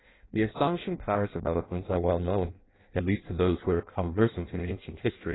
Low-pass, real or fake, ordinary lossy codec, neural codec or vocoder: 7.2 kHz; fake; AAC, 16 kbps; codec, 16 kHz in and 24 kHz out, 0.6 kbps, FireRedTTS-2 codec